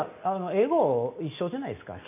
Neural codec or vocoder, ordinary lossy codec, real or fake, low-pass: none; none; real; 3.6 kHz